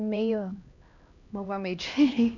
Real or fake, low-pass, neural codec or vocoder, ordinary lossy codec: fake; 7.2 kHz; codec, 16 kHz, 1 kbps, X-Codec, HuBERT features, trained on LibriSpeech; none